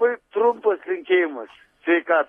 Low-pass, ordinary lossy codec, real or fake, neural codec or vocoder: 19.8 kHz; AAC, 32 kbps; real; none